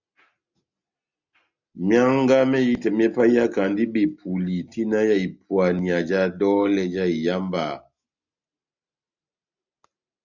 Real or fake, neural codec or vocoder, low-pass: real; none; 7.2 kHz